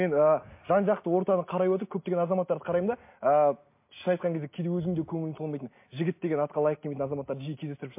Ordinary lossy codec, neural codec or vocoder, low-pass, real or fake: MP3, 24 kbps; none; 3.6 kHz; real